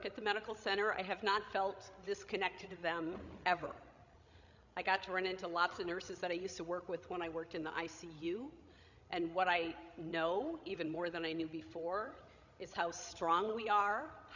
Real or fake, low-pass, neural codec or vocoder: fake; 7.2 kHz; codec, 16 kHz, 16 kbps, FreqCodec, larger model